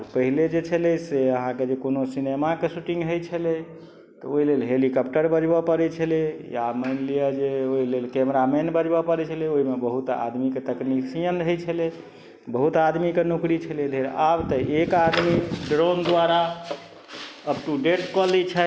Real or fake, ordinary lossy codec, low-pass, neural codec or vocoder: real; none; none; none